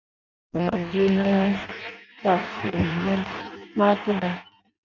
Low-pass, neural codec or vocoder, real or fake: 7.2 kHz; codec, 16 kHz in and 24 kHz out, 0.6 kbps, FireRedTTS-2 codec; fake